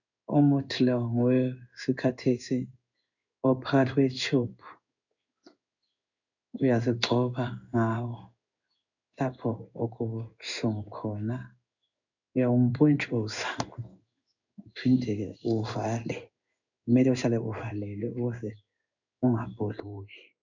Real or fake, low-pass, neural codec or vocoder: fake; 7.2 kHz; codec, 16 kHz in and 24 kHz out, 1 kbps, XY-Tokenizer